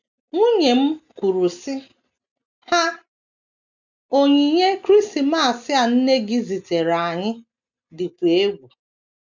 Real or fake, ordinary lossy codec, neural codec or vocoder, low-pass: real; none; none; 7.2 kHz